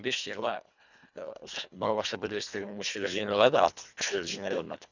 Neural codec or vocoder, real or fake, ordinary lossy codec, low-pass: codec, 24 kHz, 1.5 kbps, HILCodec; fake; none; 7.2 kHz